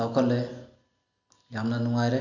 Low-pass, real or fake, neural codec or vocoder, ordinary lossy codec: 7.2 kHz; real; none; none